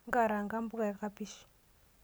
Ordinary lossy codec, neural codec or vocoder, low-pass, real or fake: none; none; none; real